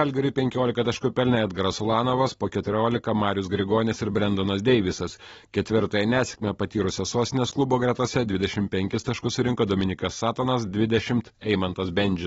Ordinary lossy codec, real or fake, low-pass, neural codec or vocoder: AAC, 24 kbps; real; 14.4 kHz; none